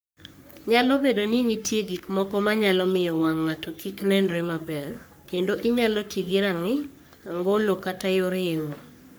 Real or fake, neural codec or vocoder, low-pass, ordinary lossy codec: fake; codec, 44.1 kHz, 3.4 kbps, Pupu-Codec; none; none